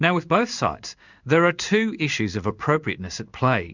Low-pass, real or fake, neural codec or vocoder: 7.2 kHz; fake; codec, 16 kHz in and 24 kHz out, 1 kbps, XY-Tokenizer